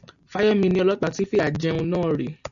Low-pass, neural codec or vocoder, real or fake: 7.2 kHz; none; real